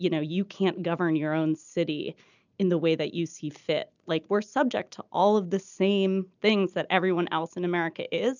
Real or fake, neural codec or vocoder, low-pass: real; none; 7.2 kHz